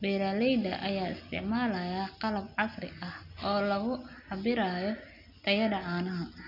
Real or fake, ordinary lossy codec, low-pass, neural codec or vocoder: real; none; 5.4 kHz; none